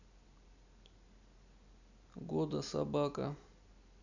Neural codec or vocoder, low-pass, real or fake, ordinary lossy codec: none; 7.2 kHz; real; none